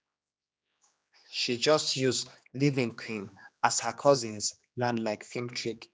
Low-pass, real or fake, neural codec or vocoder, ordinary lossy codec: none; fake; codec, 16 kHz, 2 kbps, X-Codec, HuBERT features, trained on general audio; none